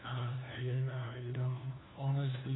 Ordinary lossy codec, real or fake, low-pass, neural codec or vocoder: AAC, 16 kbps; fake; 7.2 kHz; codec, 16 kHz, 1 kbps, FunCodec, trained on LibriTTS, 50 frames a second